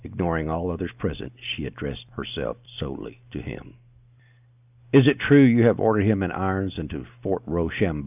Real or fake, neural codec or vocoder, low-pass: real; none; 3.6 kHz